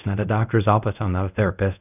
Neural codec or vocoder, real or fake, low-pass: codec, 24 kHz, 0.5 kbps, DualCodec; fake; 3.6 kHz